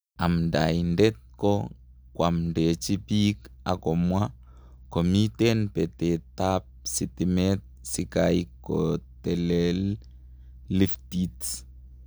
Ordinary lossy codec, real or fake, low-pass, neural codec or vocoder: none; real; none; none